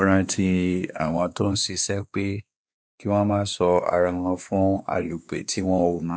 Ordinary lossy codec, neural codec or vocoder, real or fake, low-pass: none; codec, 16 kHz, 2 kbps, X-Codec, WavLM features, trained on Multilingual LibriSpeech; fake; none